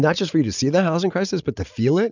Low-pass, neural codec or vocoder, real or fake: 7.2 kHz; none; real